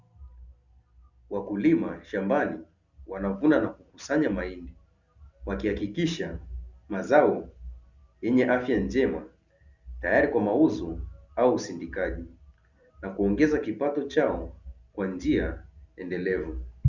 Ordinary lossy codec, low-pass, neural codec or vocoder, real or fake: Opus, 64 kbps; 7.2 kHz; none; real